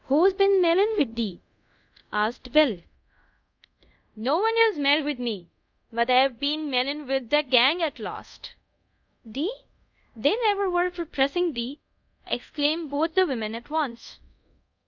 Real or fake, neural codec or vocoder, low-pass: fake; codec, 24 kHz, 0.5 kbps, DualCodec; 7.2 kHz